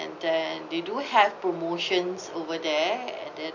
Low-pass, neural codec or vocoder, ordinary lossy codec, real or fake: 7.2 kHz; none; none; real